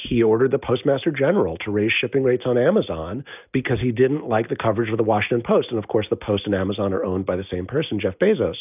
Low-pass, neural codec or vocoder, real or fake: 3.6 kHz; none; real